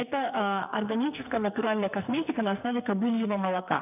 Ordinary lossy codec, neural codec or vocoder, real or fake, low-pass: none; codec, 44.1 kHz, 2.6 kbps, SNAC; fake; 3.6 kHz